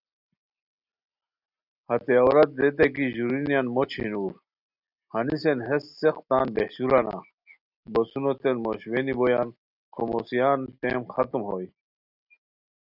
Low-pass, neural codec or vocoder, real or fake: 5.4 kHz; none; real